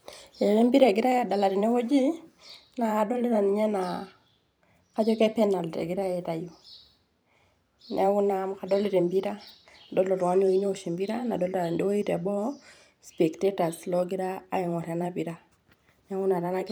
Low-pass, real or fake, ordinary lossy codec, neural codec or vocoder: none; fake; none; vocoder, 44.1 kHz, 128 mel bands every 512 samples, BigVGAN v2